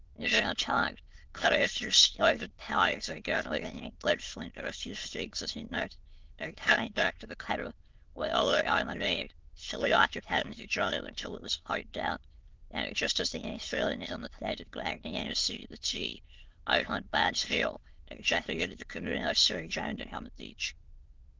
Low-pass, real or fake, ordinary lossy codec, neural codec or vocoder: 7.2 kHz; fake; Opus, 16 kbps; autoencoder, 22.05 kHz, a latent of 192 numbers a frame, VITS, trained on many speakers